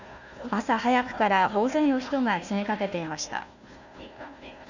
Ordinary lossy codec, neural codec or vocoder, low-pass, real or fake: none; codec, 16 kHz, 1 kbps, FunCodec, trained on Chinese and English, 50 frames a second; 7.2 kHz; fake